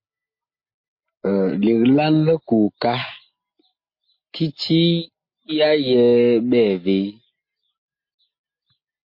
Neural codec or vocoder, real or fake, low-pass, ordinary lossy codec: none; real; 5.4 kHz; MP3, 32 kbps